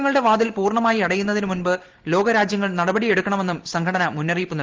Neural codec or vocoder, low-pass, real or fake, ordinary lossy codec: none; 7.2 kHz; real; Opus, 16 kbps